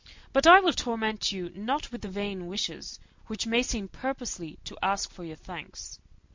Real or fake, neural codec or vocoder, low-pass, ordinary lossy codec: real; none; 7.2 kHz; MP3, 48 kbps